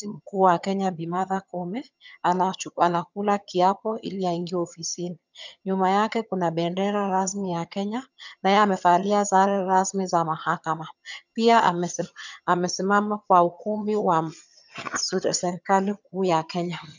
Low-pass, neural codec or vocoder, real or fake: 7.2 kHz; vocoder, 22.05 kHz, 80 mel bands, HiFi-GAN; fake